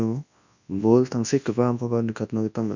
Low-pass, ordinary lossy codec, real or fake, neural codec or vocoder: 7.2 kHz; none; fake; codec, 24 kHz, 0.9 kbps, WavTokenizer, large speech release